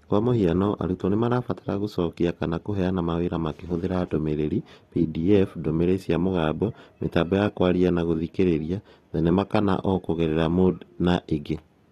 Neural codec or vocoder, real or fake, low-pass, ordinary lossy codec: none; real; 19.8 kHz; AAC, 32 kbps